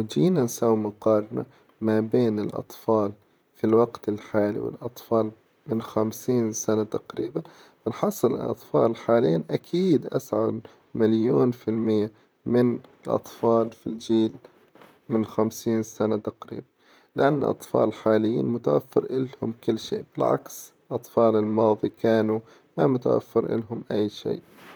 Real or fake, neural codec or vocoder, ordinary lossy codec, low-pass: fake; vocoder, 44.1 kHz, 128 mel bands, Pupu-Vocoder; none; none